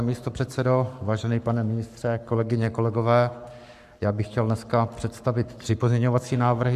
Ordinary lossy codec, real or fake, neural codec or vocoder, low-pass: MP3, 96 kbps; fake; codec, 44.1 kHz, 7.8 kbps, Pupu-Codec; 14.4 kHz